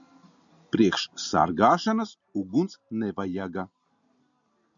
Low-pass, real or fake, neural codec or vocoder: 7.2 kHz; real; none